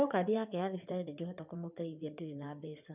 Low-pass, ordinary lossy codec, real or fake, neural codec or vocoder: 3.6 kHz; none; fake; codec, 16 kHz in and 24 kHz out, 2.2 kbps, FireRedTTS-2 codec